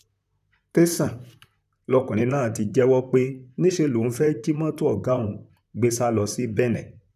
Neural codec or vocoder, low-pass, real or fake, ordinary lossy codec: vocoder, 44.1 kHz, 128 mel bands, Pupu-Vocoder; 14.4 kHz; fake; none